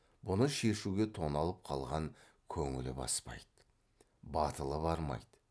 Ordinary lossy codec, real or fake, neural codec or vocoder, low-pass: none; real; none; none